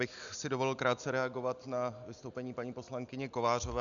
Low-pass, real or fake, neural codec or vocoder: 7.2 kHz; real; none